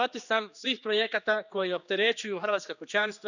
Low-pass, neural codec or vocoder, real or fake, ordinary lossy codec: 7.2 kHz; codec, 16 kHz, 2 kbps, X-Codec, HuBERT features, trained on general audio; fake; none